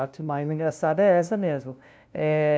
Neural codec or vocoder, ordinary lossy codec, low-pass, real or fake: codec, 16 kHz, 0.5 kbps, FunCodec, trained on LibriTTS, 25 frames a second; none; none; fake